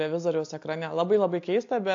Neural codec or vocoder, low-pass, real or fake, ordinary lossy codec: none; 7.2 kHz; real; AAC, 48 kbps